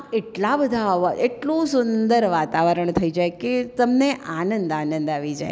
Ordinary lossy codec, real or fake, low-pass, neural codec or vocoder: none; real; none; none